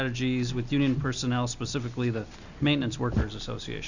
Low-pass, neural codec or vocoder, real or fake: 7.2 kHz; none; real